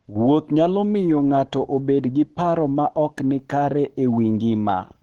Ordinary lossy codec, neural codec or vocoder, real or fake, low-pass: Opus, 16 kbps; codec, 44.1 kHz, 7.8 kbps, Pupu-Codec; fake; 19.8 kHz